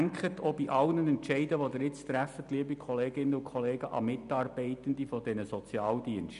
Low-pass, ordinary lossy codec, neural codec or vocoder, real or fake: 10.8 kHz; none; none; real